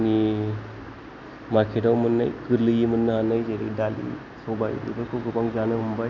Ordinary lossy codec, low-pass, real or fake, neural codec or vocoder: none; 7.2 kHz; real; none